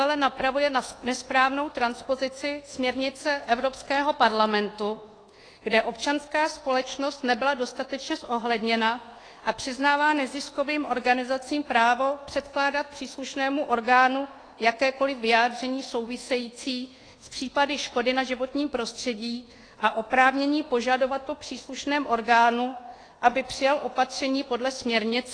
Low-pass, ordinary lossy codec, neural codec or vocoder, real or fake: 9.9 kHz; AAC, 32 kbps; codec, 24 kHz, 1.2 kbps, DualCodec; fake